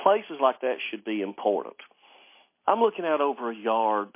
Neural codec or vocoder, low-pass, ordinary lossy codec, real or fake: none; 3.6 kHz; MP3, 24 kbps; real